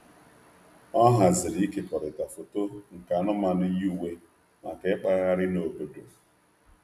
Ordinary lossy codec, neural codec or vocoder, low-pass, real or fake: none; none; 14.4 kHz; real